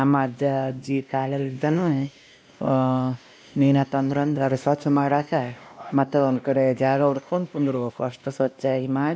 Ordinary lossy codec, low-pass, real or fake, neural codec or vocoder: none; none; fake; codec, 16 kHz, 1 kbps, X-Codec, WavLM features, trained on Multilingual LibriSpeech